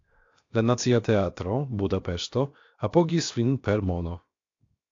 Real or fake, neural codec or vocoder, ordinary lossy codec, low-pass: fake; codec, 16 kHz, 0.7 kbps, FocalCodec; AAC, 48 kbps; 7.2 kHz